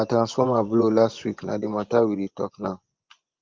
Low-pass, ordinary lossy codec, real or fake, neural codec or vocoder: 7.2 kHz; Opus, 32 kbps; fake; vocoder, 24 kHz, 100 mel bands, Vocos